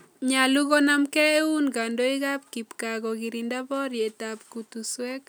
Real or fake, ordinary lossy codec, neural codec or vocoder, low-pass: real; none; none; none